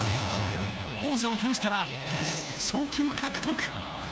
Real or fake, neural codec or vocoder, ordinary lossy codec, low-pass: fake; codec, 16 kHz, 1 kbps, FunCodec, trained on LibriTTS, 50 frames a second; none; none